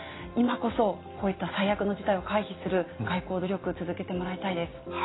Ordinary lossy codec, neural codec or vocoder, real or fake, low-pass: AAC, 16 kbps; none; real; 7.2 kHz